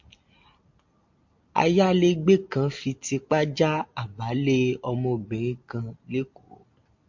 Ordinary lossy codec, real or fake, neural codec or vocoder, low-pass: MP3, 48 kbps; real; none; 7.2 kHz